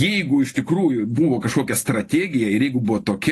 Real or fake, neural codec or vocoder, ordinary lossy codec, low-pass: real; none; AAC, 48 kbps; 14.4 kHz